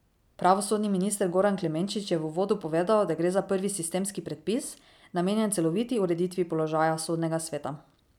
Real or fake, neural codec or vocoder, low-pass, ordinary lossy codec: real; none; 19.8 kHz; none